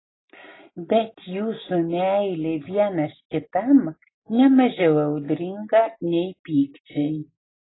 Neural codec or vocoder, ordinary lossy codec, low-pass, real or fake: none; AAC, 16 kbps; 7.2 kHz; real